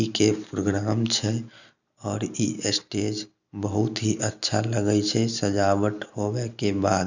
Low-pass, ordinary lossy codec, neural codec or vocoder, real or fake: 7.2 kHz; none; none; real